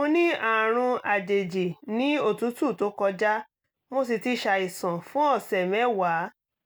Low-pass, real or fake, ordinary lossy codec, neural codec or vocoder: none; real; none; none